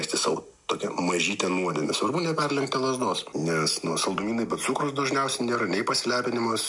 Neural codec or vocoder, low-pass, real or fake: none; 10.8 kHz; real